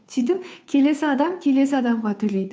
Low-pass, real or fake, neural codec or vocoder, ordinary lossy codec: none; fake; codec, 16 kHz, 2 kbps, FunCodec, trained on Chinese and English, 25 frames a second; none